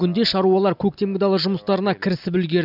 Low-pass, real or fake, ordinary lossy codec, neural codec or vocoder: 5.4 kHz; real; none; none